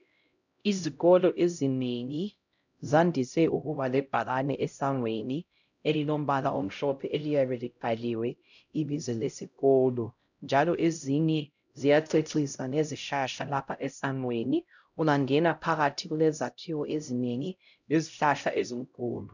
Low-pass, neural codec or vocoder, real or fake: 7.2 kHz; codec, 16 kHz, 0.5 kbps, X-Codec, HuBERT features, trained on LibriSpeech; fake